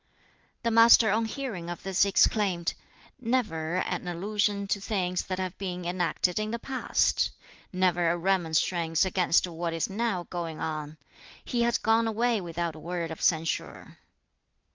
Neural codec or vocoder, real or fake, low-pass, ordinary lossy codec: none; real; 7.2 kHz; Opus, 24 kbps